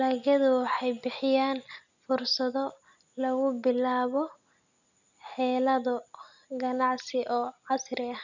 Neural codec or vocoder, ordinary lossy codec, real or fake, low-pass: none; none; real; 7.2 kHz